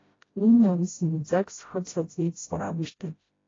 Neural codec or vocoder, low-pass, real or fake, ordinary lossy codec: codec, 16 kHz, 0.5 kbps, FreqCodec, smaller model; 7.2 kHz; fake; AAC, 32 kbps